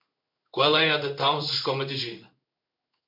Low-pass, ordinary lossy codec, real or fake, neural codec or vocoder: 5.4 kHz; MP3, 48 kbps; fake; codec, 16 kHz in and 24 kHz out, 1 kbps, XY-Tokenizer